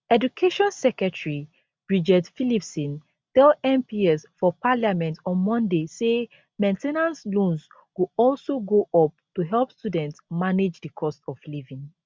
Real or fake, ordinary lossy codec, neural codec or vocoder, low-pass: real; none; none; none